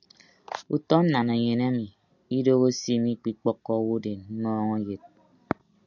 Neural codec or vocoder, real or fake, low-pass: none; real; 7.2 kHz